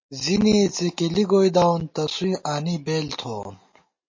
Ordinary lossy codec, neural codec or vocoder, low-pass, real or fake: MP3, 32 kbps; none; 7.2 kHz; real